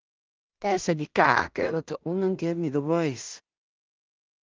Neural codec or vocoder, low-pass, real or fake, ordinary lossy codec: codec, 16 kHz in and 24 kHz out, 0.4 kbps, LongCat-Audio-Codec, two codebook decoder; 7.2 kHz; fake; Opus, 24 kbps